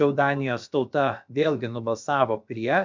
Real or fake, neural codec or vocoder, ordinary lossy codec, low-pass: fake; codec, 16 kHz, about 1 kbps, DyCAST, with the encoder's durations; MP3, 64 kbps; 7.2 kHz